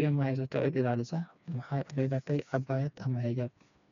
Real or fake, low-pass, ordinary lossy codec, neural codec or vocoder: fake; 7.2 kHz; none; codec, 16 kHz, 2 kbps, FreqCodec, smaller model